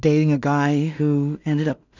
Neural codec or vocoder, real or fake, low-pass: codec, 16 kHz in and 24 kHz out, 0.4 kbps, LongCat-Audio-Codec, two codebook decoder; fake; 7.2 kHz